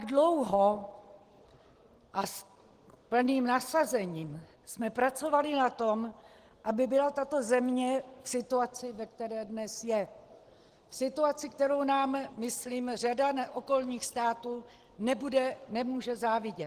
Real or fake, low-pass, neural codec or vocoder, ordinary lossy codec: real; 14.4 kHz; none; Opus, 16 kbps